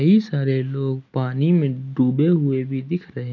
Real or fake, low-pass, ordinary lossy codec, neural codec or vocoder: real; 7.2 kHz; none; none